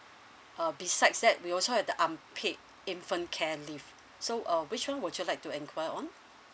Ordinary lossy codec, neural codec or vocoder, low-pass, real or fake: none; none; none; real